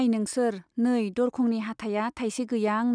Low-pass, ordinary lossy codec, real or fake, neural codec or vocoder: 9.9 kHz; none; real; none